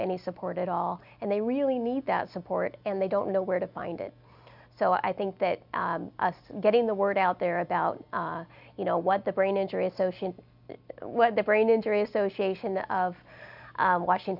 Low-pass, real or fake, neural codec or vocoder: 5.4 kHz; real; none